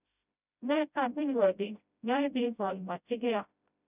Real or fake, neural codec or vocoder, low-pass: fake; codec, 16 kHz, 0.5 kbps, FreqCodec, smaller model; 3.6 kHz